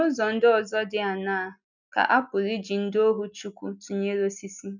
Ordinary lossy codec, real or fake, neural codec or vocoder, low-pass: none; real; none; 7.2 kHz